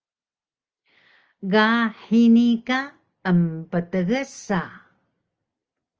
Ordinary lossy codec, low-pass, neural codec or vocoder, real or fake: Opus, 24 kbps; 7.2 kHz; none; real